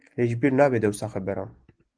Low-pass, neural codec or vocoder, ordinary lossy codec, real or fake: 9.9 kHz; none; Opus, 24 kbps; real